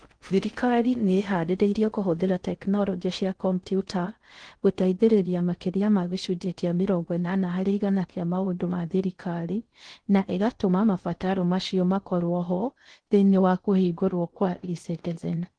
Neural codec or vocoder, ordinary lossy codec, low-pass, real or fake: codec, 16 kHz in and 24 kHz out, 0.6 kbps, FocalCodec, streaming, 4096 codes; Opus, 16 kbps; 9.9 kHz; fake